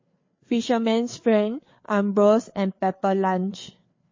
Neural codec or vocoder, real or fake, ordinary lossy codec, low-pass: codec, 16 kHz, 4 kbps, FreqCodec, larger model; fake; MP3, 32 kbps; 7.2 kHz